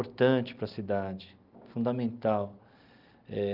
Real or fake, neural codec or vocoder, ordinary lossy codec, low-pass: real; none; Opus, 24 kbps; 5.4 kHz